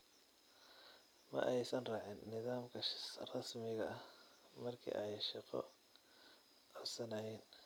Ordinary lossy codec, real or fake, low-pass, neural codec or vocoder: none; fake; none; vocoder, 44.1 kHz, 128 mel bands every 256 samples, BigVGAN v2